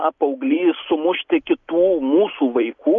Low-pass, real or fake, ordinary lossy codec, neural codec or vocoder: 7.2 kHz; real; MP3, 64 kbps; none